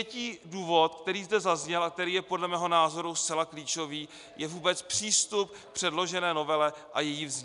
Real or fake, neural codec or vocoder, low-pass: real; none; 10.8 kHz